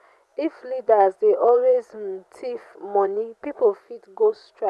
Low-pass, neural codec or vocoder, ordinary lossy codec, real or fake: none; vocoder, 24 kHz, 100 mel bands, Vocos; none; fake